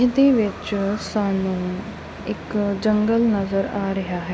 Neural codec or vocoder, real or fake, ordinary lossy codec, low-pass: none; real; none; none